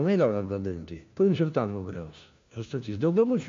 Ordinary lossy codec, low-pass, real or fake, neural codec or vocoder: MP3, 48 kbps; 7.2 kHz; fake; codec, 16 kHz, 1 kbps, FunCodec, trained on LibriTTS, 50 frames a second